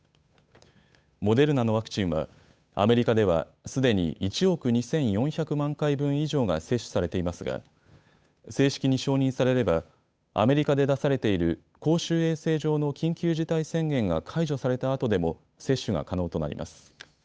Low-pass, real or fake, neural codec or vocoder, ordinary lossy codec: none; fake; codec, 16 kHz, 8 kbps, FunCodec, trained on Chinese and English, 25 frames a second; none